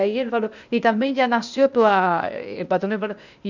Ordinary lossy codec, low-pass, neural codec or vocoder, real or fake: none; 7.2 kHz; codec, 16 kHz, 0.8 kbps, ZipCodec; fake